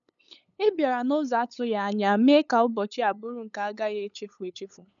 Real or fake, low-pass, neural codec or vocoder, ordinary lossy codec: fake; 7.2 kHz; codec, 16 kHz, 8 kbps, FunCodec, trained on LibriTTS, 25 frames a second; none